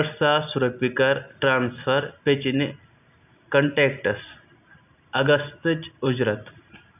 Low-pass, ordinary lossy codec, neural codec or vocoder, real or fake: 3.6 kHz; none; none; real